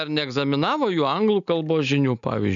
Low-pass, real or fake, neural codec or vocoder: 7.2 kHz; real; none